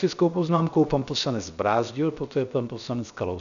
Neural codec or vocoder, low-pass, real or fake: codec, 16 kHz, 0.7 kbps, FocalCodec; 7.2 kHz; fake